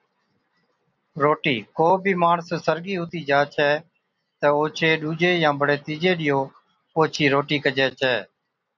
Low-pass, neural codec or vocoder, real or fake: 7.2 kHz; none; real